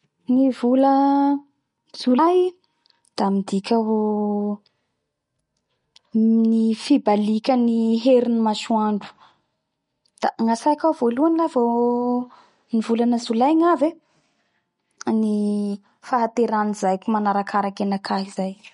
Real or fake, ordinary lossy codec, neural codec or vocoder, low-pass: real; MP3, 48 kbps; none; 10.8 kHz